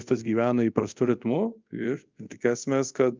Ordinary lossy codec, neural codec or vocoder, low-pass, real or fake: Opus, 24 kbps; codec, 24 kHz, 0.5 kbps, DualCodec; 7.2 kHz; fake